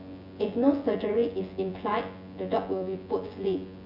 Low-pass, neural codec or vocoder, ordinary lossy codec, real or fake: 5.4 kHz; vocoder, 24 kHz, 100 mel bands, Vocos; none; fake